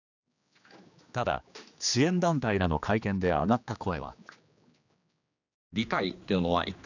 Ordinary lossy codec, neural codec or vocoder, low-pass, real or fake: none; codec, 16 kHz, 2 kbps, X-Codec, HuBERT features, trained on general audio; 7.2 kHz; fake